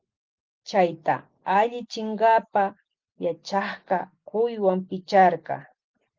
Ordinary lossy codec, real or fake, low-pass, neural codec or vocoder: Opus, 32 kbps; real; 7.2 kHz; none